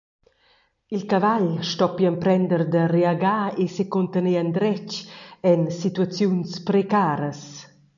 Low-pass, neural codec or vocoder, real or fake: 7.2 kHz; none; real